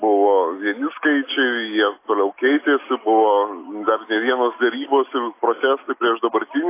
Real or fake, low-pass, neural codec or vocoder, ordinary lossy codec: real; 3.6 kHz; none; AAC, 24 kbps